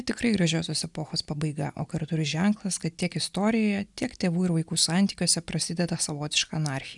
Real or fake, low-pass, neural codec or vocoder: real; 10.8 kHz; none